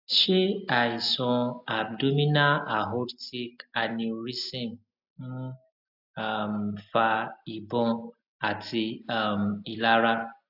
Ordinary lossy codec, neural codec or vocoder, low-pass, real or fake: AAC, 48 kbps; none; 5.4 kHz; real